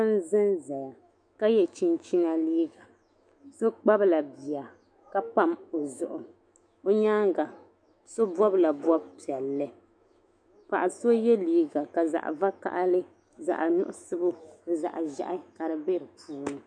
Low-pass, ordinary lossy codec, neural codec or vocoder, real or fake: 9.9 kHz; MP3, 64 kbps; autoencoder, 48 kHz, 128 numbers a frame, DAC-VAE, trained on Japanese speech; fake